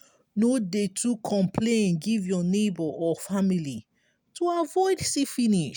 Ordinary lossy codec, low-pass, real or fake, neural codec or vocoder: none; none; real; none